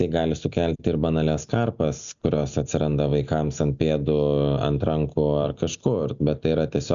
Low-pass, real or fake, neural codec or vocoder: 7.2 kHz; real; none